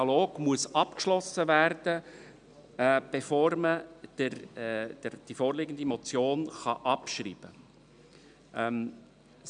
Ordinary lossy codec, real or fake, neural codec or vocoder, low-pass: none; real; none; 9.9 kHz